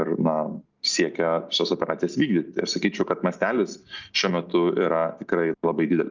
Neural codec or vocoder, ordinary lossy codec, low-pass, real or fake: none; Opus, 24 kbps; 7.2 kHz; real